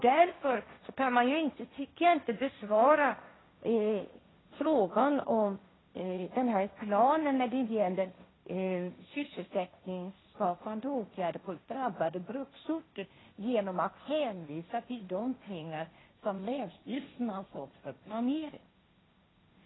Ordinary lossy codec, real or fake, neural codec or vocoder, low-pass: AAC, 16 kbps; fake; codec, 16 kHz, 1.1 kbps, Voila-Tokenizer; 7.2 kHz